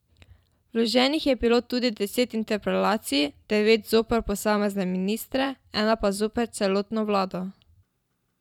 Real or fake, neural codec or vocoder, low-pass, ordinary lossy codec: fake; vocoder, 44.1 kHz, 128 mel bands every 512 samples, BigVGAN v2; 19.8 kHz; none